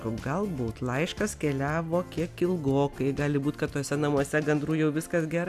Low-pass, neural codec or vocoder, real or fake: 14.4 kHz; none; real